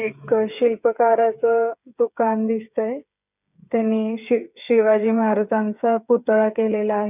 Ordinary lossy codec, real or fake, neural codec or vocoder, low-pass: none; fake; codec, 16 kHz, 8 kbps, FreqCodec, smaller model; 3.6 kHz